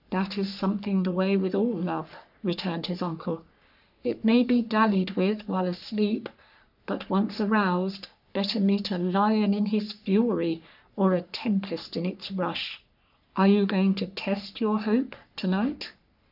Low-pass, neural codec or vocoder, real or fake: 5.4 kHz; codec, 44.1 kHz, 3.4 kbps, Pupu-Codec; fake